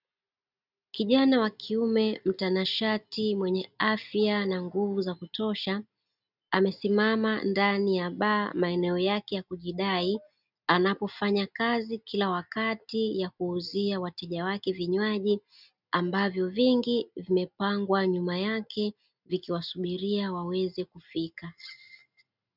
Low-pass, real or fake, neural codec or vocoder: 5.4 kHz; real; none